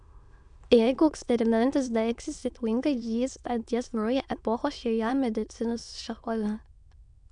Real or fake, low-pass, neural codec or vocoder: fake; 9.9 kHz; autoencoder, 22.05 kHz, a latent of 192 numbers a frame, VITS, trained on many speakers